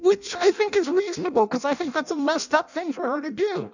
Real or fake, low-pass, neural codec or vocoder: fake; 7.2 kHz; codec, 16 kHz in and 24 kHz out, 0.6 kbps, FireRedTTS-2 codec